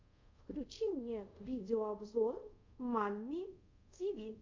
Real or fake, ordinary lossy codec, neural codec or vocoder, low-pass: fake; MP3, 64 kbps; codec, 24 kHz, 0.5 kbps, DualCodec; 7.2 kHz